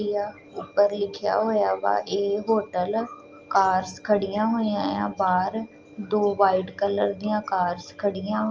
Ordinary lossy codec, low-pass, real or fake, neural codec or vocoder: Opus, 24 kbps; 7.2 kHz; real; none